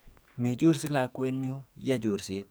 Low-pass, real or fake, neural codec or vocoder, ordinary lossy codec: none; fake; codec, 44.1 kHz, 2.6 kbps, SNAC; none